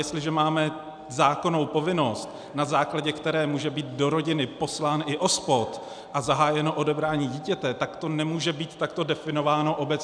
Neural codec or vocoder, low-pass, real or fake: none; 9.9 kHz; real